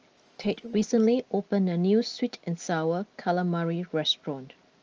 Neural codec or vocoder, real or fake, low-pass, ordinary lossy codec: none; real; 7.2 kHz; Opus, 24 kbps